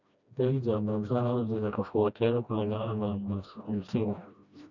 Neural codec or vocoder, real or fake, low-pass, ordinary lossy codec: codec, 16 kHz, 1 kbps, FreqCodec, smaller model; fake; 7.2 kHz; none